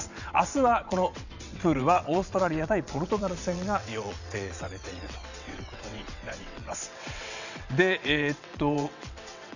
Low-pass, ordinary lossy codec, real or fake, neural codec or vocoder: 7.2 kHz; none; fake; vocoder, 22.05 kHz, 80 mel bands, WaveNeXt